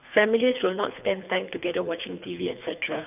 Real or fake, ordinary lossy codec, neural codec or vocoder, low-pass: fake; none; codec, 24 kHz, 3 kbps, HILCodec; 3.6 kHz